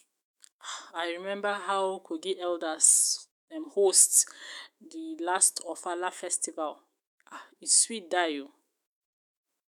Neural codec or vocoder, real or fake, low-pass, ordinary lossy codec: autoencoder, 48 kHz, 128 numbers a frame, DAC-VAE, trained on Japanese speech; fake; none; none